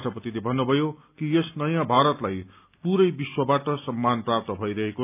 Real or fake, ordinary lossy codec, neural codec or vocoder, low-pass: real; none; none; 3.6 kHz